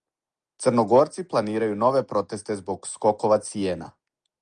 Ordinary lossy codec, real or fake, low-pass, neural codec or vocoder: Opus, 32 kbps; real; 9.9 kHz; none